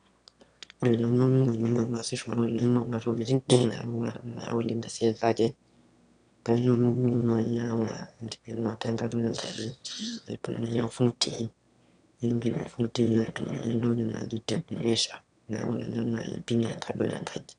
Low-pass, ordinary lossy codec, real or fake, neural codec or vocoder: 9.9 kHz; none; fake; autoencoder, 22.05 kHz, a latent of 192 numbers a frame, VITS, trained on one speaker